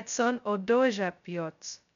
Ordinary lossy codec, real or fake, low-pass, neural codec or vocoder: none; fake; 7.2 kHz; codec, 16 kHz, 0.2 kbps, FocalCodec